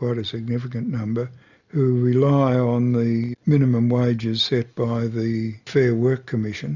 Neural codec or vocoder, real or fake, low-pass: none; real; 7.2 kHz